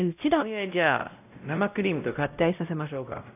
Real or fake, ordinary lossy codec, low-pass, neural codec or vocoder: fake; none; 3.6 kHz; codec, 16 kHz, 0.5 kbps, X-Codec, WavLM features, trained on Multilingual LibriSpeech